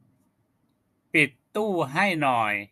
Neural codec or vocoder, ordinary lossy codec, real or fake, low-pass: vocoder, 48 kHz, 128 mel bands, Vocos; MP3, 96 kbps; fake; 14.4 kHz